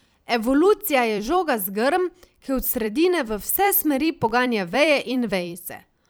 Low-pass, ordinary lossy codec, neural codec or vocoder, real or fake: none; none; vocoder, 44.1 kHz, 128 mel bands every 256 samples, BigVGAN v2; fake